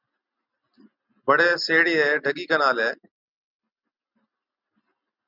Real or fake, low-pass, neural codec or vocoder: real; 5.4 kHz; none